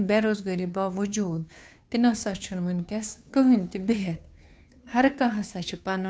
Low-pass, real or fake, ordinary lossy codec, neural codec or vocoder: none; fake; none; codec, 16 kHz, 2 kbps, FunCodec, trained on Chinese and English, 25 frames a second